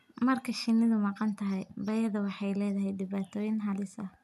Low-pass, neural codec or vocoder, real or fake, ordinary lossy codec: 14.4 kHz; none; real; none